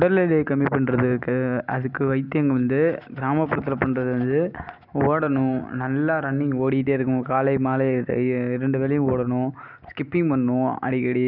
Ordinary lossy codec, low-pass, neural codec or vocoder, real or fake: none; 5.4 kHz; none; real